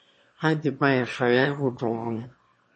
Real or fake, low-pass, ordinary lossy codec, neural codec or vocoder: fake; 9.9 kHz; MP3, 32 kbps; autoencoder, 22.05 kHz, a latent of 192 numbers a frame, VITS, trained on one speaker